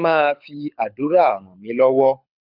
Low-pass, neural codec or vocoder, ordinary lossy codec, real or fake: 5.4 kHz; codec, 24 kHz, 6 kbps, HILCodec; none; fake